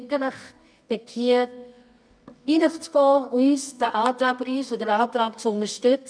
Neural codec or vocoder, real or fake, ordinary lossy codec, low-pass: codec, 24 kHz, 0.9 kbps, WavTokenizer, medium music audio release; fake; none; 9.9 kHz